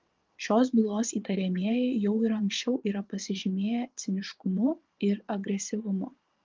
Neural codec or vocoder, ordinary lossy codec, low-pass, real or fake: vocoder, 22.05 kHz, 80 mel bands, Vocos; Opus, 32 kbps; 7.2 kHz; fake